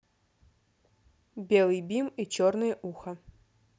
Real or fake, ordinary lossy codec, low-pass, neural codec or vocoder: real; none; none; none